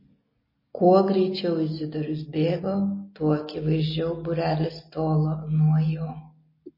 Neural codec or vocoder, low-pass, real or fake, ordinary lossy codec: none; 5.4 kHz; real; MP3, 24 kbps